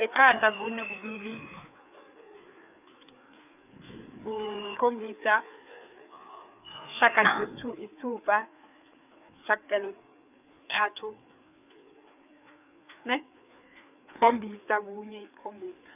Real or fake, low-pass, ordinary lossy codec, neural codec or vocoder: fake; 3.6 kHz; none; codec, 16 kHz, 4 kbps, FreqCodec, larger model